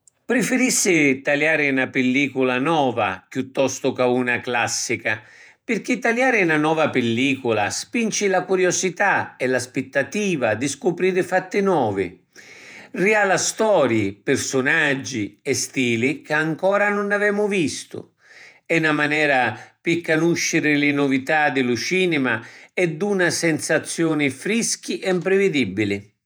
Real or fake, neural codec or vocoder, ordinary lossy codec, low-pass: fake; vocoder, 48 kHz, 128 mel bands, Vocos; none; none